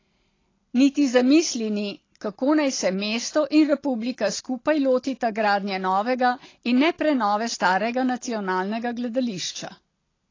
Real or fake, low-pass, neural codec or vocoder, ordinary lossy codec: real; 7.2 kHz; none; AAC, 32 kbps